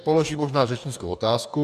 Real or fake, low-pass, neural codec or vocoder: fake; 14.4 kHz; codec, 44.1 kHz, 2.6 kbps, DAC